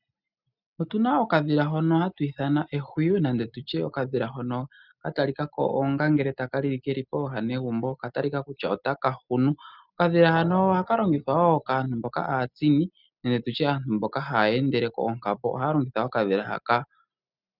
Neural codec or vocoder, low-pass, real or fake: none; 5.4 kHz; real